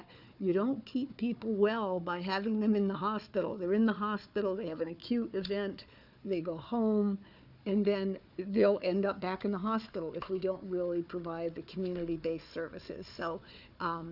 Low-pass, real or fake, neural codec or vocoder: 5.4 kHz; fake; codec, 16 kHz, 4 kbps, FunCodec, trained on Chinese and English, 50 frames a second